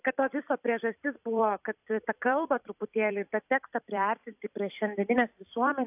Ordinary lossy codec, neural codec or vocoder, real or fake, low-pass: AAC, 32 kbps; vocoder, 44.1 kHz, 128 mel bands every 256 samples, BigVGAN v2; fake; 3.6 kHz